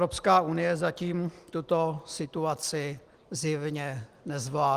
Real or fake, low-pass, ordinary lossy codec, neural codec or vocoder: real; 14.4 kHz; Opus, 24 kbps; none